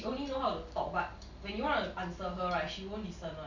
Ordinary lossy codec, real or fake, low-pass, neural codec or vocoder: none; real; 7.2 kHz; none